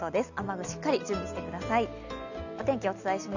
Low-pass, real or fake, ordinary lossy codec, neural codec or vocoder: 7.2 kHz; real; none; none